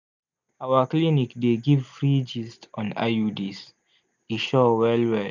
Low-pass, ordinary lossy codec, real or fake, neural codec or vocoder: 7.2 kHz; none; real; none